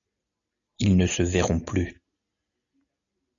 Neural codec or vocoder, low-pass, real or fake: none; 7.2 kHz; real